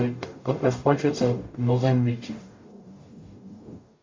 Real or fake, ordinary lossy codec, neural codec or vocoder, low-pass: fake; MP3, 48 kbps; codec, 44.1 kHz, 0.9 kbps, DAC; 7.2 kHz